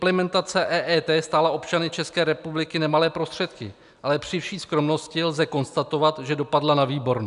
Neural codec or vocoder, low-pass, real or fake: none; 10.8 kHz; real